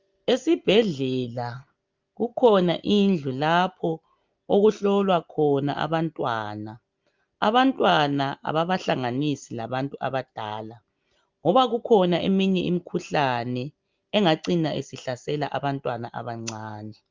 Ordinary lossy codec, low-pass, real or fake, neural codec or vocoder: Opus, 32 kbps; 7.2 kHz; real; none